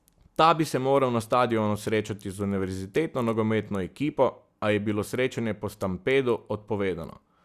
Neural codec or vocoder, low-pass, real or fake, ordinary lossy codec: none; 14.4 kHz; real; Opus, 64 kbps